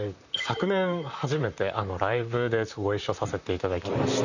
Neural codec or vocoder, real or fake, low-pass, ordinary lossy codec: vocoder, 44.1 kHz, 128 mel bands, Pupu-Vocoder; fake; 7.2 kHz; none